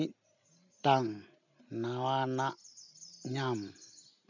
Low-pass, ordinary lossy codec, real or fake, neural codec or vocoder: 7.2 kHz; none; real; none